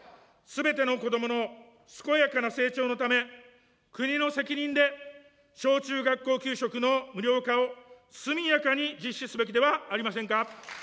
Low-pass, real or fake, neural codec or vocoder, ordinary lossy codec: none; real; none; none